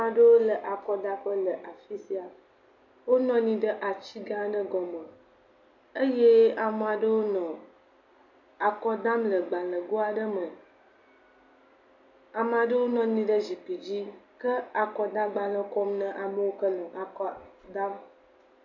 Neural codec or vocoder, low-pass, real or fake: none; 7.2 kHz; real